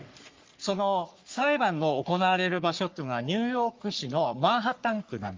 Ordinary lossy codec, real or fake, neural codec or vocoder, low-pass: Opus, 32 kbps; fake; codec, 44.1 kHz, 3.4 kbps, Pupu-Codec; 7.2 kHz